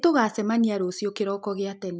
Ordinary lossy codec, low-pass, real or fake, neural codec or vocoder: none; none; real; none